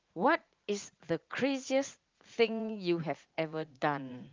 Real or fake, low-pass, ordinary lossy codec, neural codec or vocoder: fake; 7.2 kHz; Opus, 32 kbps; vocoder, 44.1 kHz, 80 mel bands, Vocos